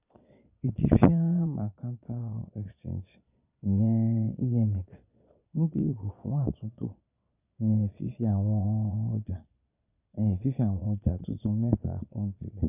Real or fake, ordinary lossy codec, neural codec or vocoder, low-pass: fake; none; vocoder, 22.05 kHz, 80 mel bands, Vocos; 3.6 kHz